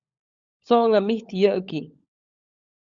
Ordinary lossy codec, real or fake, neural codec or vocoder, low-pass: Opus, 64 kbps; fake; codec, 16 kHz, 16 kbps, FunCodec, trained on LibriTTS, 50 frames a second; 7.2 kHz